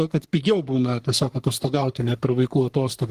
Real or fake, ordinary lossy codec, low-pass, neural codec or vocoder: fake; Opus, 24 kbps; 14.4 kHz; codec, 44.1 kHz, 3.4 kbps, Pupu-Codec